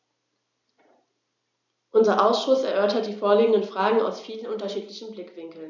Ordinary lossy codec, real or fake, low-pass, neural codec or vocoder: none; real; 7.2 kHz; none